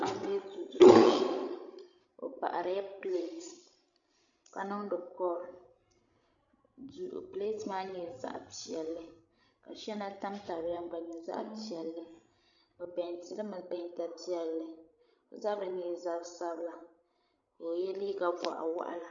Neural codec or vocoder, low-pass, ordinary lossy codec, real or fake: codec, 16 kHz, 16 kbps, FreqCodec, larger model; 7.2 kHz; MP3, 96 kbps; fake